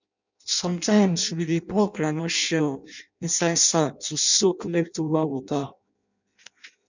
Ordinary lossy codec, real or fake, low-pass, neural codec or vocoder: none; fake; 7.2 kHz; codec, 16 kHz in and 24 kHz out, 0.6 kbps, FireRedTTS-2 codec